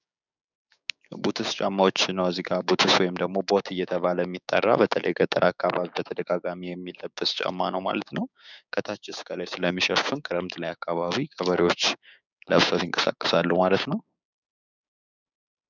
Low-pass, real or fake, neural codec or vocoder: 7.2 kHz; fake; codec, 16 kHz, 6 kbps, DAC